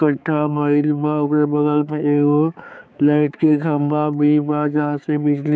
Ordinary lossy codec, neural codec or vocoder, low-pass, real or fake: none; codec, 16 kHz, 4 kbps, X-Codec, HuBERT features, trained on balanced general audio; none; fake